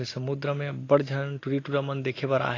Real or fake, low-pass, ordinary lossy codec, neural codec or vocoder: real; 7.2 kHz; AAC, 32 kbps; none